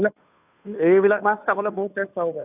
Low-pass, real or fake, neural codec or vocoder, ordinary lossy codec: 3.6 kHz; fake; codec, 16 kHz, 2 kbps, FunCodec, trained on Chinese and English, 25 frames a second; none